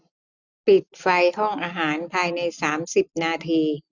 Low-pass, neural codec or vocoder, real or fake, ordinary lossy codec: 7.2 kHz; none; real; none